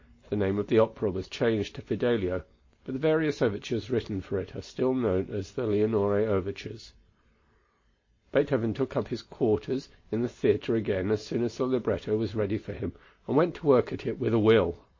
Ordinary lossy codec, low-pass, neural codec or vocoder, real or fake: MP3, 32 kbps; 7.2 kHz; none; real